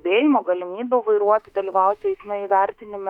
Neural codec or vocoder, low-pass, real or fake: autoencoder, 48 kHz, 32 numbers a frame, DAC-VAE, trained on Japanese speech; 19.8 kHz; fake